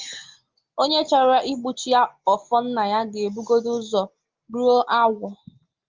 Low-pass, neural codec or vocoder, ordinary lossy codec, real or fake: 7.2 kHz; none; Opus, 32 kbps; real